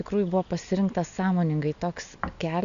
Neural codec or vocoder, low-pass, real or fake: none; 7.2 kHz; real